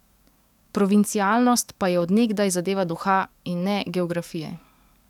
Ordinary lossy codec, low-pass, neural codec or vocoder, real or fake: none; 19.8 kHz; codec, 44.1 kHz, 7.8 kbps, DAC; fake